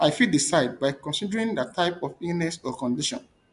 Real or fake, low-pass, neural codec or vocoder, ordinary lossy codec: real; 10.8 kHz; none; MP3, 64 kbps